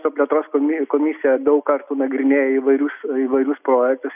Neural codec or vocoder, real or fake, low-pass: none; real; 3.6 kHz